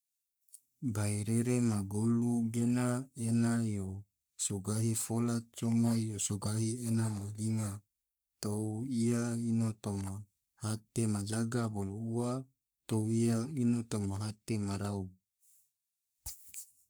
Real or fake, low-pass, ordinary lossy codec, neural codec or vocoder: fake; none; none; codec, 44.1 kHz, 3.4 kbps, Pupu-Codec